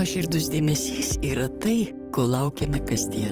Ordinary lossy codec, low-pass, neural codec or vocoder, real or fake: Opus, 24 kbps; 14.4 kHz; vocoder, 44.1 kHz, 128 mel bands, Pupu-Vocoder; fake